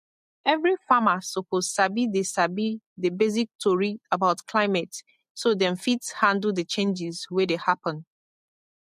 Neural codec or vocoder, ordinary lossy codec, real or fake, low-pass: none; MP3, 64 kbps; real; 14.4 kHz